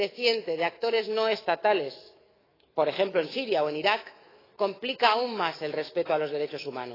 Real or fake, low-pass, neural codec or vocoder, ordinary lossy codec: fake; 5.4 kHz; autoencoder, 48 kHz, 128 numbers a frame, DAC-VAE, trained on Japanese speech; AAC, 24 kbps